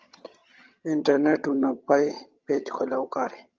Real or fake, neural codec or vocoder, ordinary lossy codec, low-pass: fake; vocoder, 22.05 kHz, 80 mel bands, HiFi-GAN; Opus, 24 kbps; 7.2 kHz